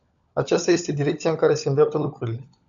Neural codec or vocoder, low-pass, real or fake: codec, 16 kHz, 16 kbps, FunCodec, trained on LibriTTS, 50 frames a second; 7.2 kHz; fake